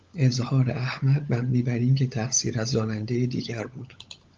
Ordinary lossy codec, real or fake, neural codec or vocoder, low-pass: Opus, 32 kbps; fake; codec, 16 kHz, 16 kbps, FunCodec, trained on LibriTTS, 50 frames a second; 7.2 kHz